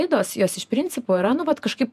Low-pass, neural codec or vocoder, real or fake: 14.4 kHz; vocoder, 48 kHz, 128 mel bands, Vocos; fake